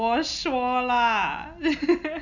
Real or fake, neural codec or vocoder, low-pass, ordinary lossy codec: real; none; 7.2 kHz; none